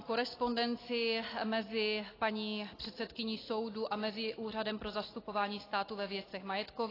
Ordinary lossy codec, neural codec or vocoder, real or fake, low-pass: AAC, 24 kbps; none; real; 5.4 kHz